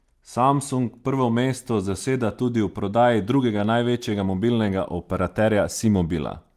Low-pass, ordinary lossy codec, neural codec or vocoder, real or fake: 14.4 kHz; Opus, 32 kbps; none; real